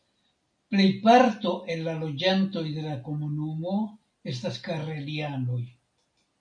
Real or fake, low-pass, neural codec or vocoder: real; 9.9 kHz; none